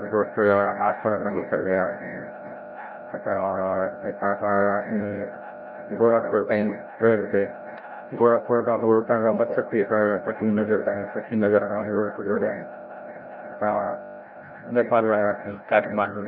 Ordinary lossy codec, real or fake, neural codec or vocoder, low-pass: none; fake; codec, 16 kHz, 0.5 kbps, FreqCodec, larger model; 5.4 kHz